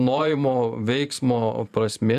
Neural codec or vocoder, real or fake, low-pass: vocoder, 44.1 kHz, 128 mel bands every 512 samples, BigVGAN v2; fake; 14.4 kHz